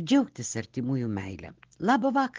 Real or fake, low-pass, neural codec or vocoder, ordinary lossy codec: real; 7.2 kHz; none; Opus, 16 kbps